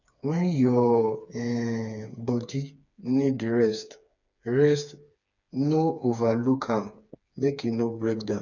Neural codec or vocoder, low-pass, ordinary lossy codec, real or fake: codec, 16 kHz, 4 kbps, FreqCodec, smaller model; 7.2 kHz; none; fake